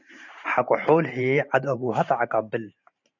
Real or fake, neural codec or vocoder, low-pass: fake; vocoder, 44.1 kHz, 128 mel bands every 512 samples, BigVGAN v2; 7.2 kHz